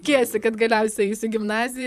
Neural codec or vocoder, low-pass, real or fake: vocoder, 44.1 kHz, 128 mel bands, Pupu-Vocoder; 14.4 kHz; fake